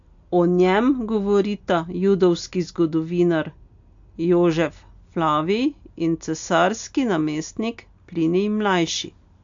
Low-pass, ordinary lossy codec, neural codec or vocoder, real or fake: 7.2 kHz; AAC, 64 kbps; none; real